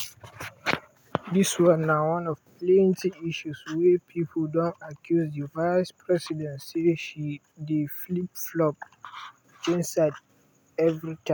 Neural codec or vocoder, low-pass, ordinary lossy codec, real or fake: none; none; none; real